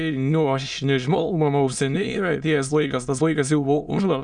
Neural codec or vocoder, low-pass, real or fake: autoencoder, 22.05 kHz, a latent of 192 numbers a frame, VITS, trained on many speakers; 9.9 kHz; fake